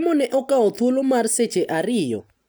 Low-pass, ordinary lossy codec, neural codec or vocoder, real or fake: none; none; none; real